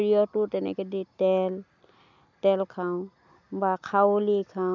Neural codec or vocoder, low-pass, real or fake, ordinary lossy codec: none; 7.2 kHz; real; none